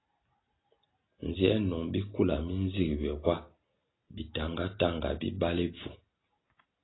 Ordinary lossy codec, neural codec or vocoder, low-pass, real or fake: AAC, 16 kbps; none; 7.2 kHz; real